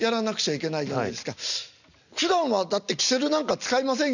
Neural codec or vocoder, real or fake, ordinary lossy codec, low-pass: none; real; none; 7.2 kHz